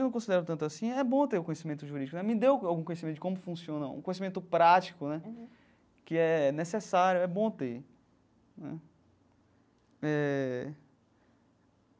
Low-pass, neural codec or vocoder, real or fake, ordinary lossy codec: none; none; real; none